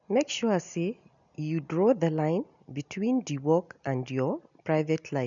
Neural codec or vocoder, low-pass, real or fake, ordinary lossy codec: none; 7.2 kHz; real; none